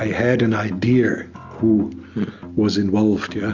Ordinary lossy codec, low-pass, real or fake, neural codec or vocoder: Opus, 64 kbps; 7.2 kHz; real; none